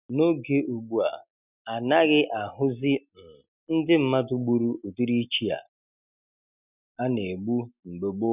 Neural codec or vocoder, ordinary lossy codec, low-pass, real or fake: none; none; 3.6 kHz; real